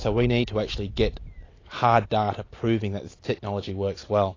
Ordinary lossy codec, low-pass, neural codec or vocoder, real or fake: AAC, 32 kbps; 7.2 kHz; none; real